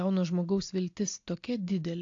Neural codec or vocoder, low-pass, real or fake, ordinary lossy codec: none; 7.2 kHz; real; AAC, 48 kbps